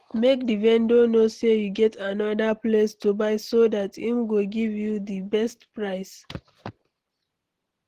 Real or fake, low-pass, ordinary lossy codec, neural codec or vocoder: real; 14.4 kHz; Opus, 16 kbps; none